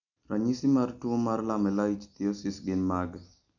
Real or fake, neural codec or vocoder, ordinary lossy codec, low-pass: real; none; none; 7.2 kHz